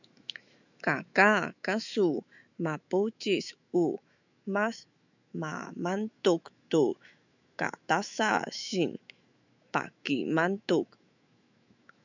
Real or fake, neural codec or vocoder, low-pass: fake; autoencoder, 48 kHz, 128 numbers a frame, DAC-VAE, trained on Japanese speech; 7.2 kHz